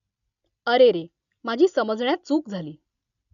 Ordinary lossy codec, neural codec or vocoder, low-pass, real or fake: none; none; 7.2 kHz; real